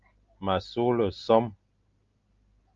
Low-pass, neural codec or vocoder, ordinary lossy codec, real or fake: 7.2 kHz; none; Opus, 32 kbps; real